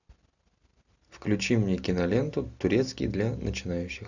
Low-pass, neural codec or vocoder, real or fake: 7.2 kHz; none; real